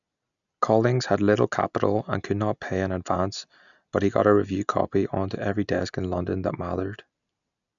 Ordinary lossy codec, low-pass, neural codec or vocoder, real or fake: none; 7.2 kHz; none; real